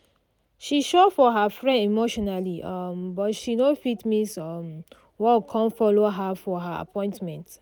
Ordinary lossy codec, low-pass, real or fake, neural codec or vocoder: none; none; real; none